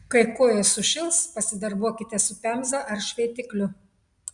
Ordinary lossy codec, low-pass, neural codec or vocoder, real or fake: Opus, 64 kbps; 10.8 kHz; none; real